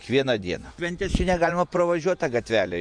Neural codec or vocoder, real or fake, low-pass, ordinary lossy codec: vocoder, 24 kHz, 100 mel bands, Vocos; fake; 9.9 kHz; MP3, 64 kbps